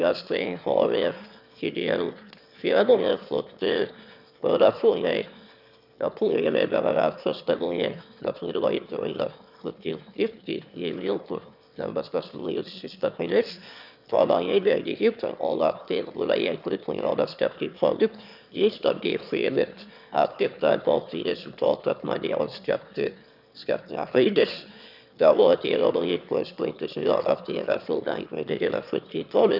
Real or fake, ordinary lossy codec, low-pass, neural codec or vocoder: fake; none; 5.4 kHz; autoencoder, 22.05 kHz, a latent of 192 numbers a frame, VITS, trained on one speaker